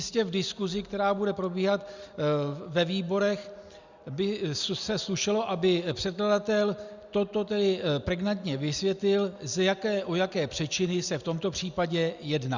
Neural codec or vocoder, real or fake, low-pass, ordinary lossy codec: none; real; 7.2 kHz; Opus, 64 kbps